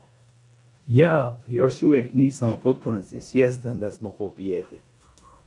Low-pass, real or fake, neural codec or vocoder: 10.8 kHz; fake; codec, 16 kHz in and 24 kHz out, 0.9 kbps, LongCat-Audio-Codec, four codebook decoder